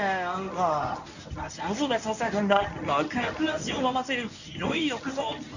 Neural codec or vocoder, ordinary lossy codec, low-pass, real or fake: codec, 24 kHz, 0.9 kbps, WavTokenizer, medium speech release version 1; none; 7.2 kHz; fake